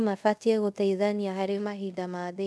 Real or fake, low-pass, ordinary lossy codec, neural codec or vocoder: fake; none; none; codec, 24 kHz, 0.5 kbps, DualCodec